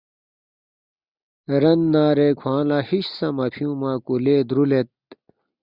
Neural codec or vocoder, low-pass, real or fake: none; 5.4 kHz; real